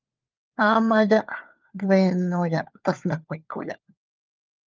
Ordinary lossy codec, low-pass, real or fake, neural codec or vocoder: Opus, 24 kbps; 7.2 kHz; fake; codec, 16 kHz, 4 kbps, FunCodec, trained on LibriTTS, 50 frames a second